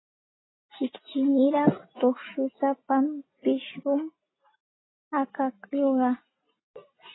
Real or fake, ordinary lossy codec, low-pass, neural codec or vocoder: real; AAC, 16 kbps; 7.2 kHz; none